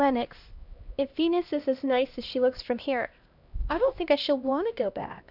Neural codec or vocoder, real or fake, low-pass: codec, 16 kHz, 1 kbps, X-Codec, HuBERT features, trained on LibriSpeech; fake; 5.4 kHz